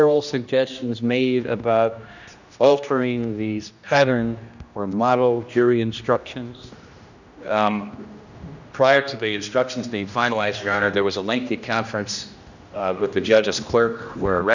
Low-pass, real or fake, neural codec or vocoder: 7.2 kHz; fake; codec, 16 kHz, 1 kbps, X-Codec, HuBERT features, trained on general audio